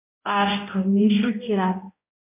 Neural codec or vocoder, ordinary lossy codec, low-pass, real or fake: codec, 16 kHz, 0.5 kbps, X-Codec, HuBERT features, trained on balanced general audio; MP3, 24 kbps; 3.6 kHz; fake